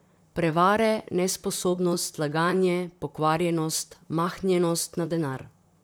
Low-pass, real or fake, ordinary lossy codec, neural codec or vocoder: none; fake; none; vocoder, 44.1 kHz, 128 mel bands, Pupu-Vocoder